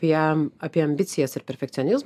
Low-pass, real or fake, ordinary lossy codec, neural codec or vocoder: 14.4 kHz; real; AAC, 96 kbps; none